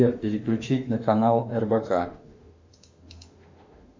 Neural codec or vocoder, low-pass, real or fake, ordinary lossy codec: autoencoder, 48 kHz, 32 numbers a frame, DAC-VAE, trained on Japanese speech; 7.2 kHz; fake; MP3, 48 kbps